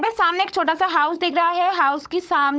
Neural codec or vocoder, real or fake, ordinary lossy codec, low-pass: codec, 16 kHz, 16 kbps, FunCodec, trained on LibriTTS, 50 frames a second; fake; none; none